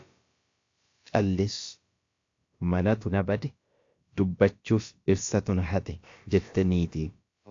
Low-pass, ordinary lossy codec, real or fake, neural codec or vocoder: 7.2 kHz; AAC, 48 kbps; fake; codec, 16 kHz, about 1 kbps, DyCAST, with the encoder's durations